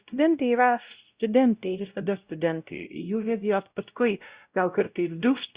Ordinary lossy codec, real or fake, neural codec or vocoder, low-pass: Opus, 64 kbps; fake; codec, 16 kHz, 0.5 kbps, X-Codec, WavLM features, trained on Multilingual LibriSpeech; 3.6 kHz